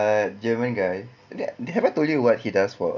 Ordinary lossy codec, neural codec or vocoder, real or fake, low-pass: none; none; real; 7.2 kHz